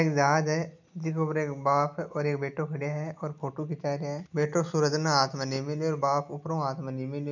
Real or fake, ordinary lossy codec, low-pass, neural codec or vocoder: real; none; 7.2 kHz; none